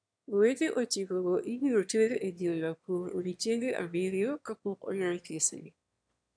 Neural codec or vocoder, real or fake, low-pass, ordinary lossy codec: autoencoder, 22.05 kHz, a latent of 192 numbers a frame, VITS, trained on one speaker; fake; 9.9 kHz; none